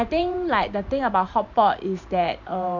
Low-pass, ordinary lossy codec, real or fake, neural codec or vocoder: 7.2 kHz; none; fake; vocoder, 44.1 kHz, 128 mel bands every 512 samples, BigVGAN v2